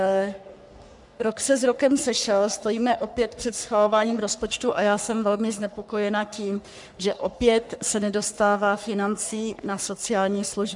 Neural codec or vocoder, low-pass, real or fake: codec, 44.1 kHz, 3.4 kbps, Pupu-Codec; 10.8 kHz; fake